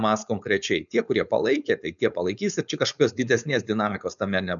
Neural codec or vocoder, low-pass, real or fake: codec, 16 kHz, 4.8 kbps, FACodec; 7.2 kHz; fake